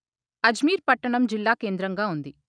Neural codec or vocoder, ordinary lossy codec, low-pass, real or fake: none; none; 9.9 kHz; real